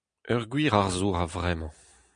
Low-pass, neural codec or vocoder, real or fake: 9.9 kHz; none; real